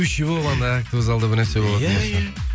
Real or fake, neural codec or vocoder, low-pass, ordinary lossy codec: real; none; none; none